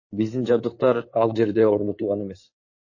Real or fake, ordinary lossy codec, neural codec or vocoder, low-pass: fake; MP3, 32 kbps; codec, 16 kHz in and 24 kHz out, 2.2 kbps, FireRedTTS-2 codec; 7.2 kHz